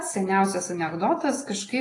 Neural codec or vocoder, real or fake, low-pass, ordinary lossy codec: none; real; 10.8 kHz; AAC, 32 kbps